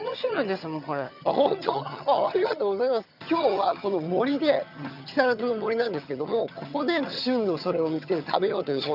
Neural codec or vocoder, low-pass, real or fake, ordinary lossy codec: vocoder, 22.05 kHz, 80 mel bands, HiFi-GAN; 5.4 kHz; fake; none